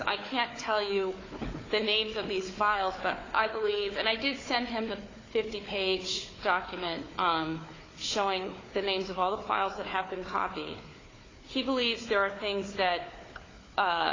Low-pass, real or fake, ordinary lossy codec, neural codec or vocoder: 7.2 kHz; fake; AAC, 32 kbps; codec, 16 kHz, 4 kbps, FunCodec, trained on Chinese and English, 50 frames a second